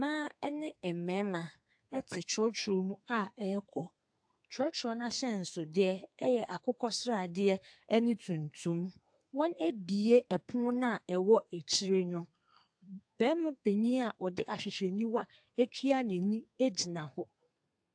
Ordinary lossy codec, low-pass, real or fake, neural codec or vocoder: AAC, 64 kbps; 9.9 kHz; fake; codec, 32 kHz, 1.9 kbps, SNAC